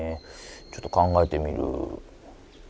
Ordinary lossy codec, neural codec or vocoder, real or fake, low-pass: none; none; real; none